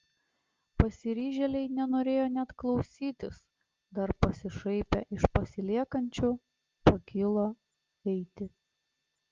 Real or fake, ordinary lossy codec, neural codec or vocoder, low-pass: real; Opus, 32 kbps; none; 7.2 kHz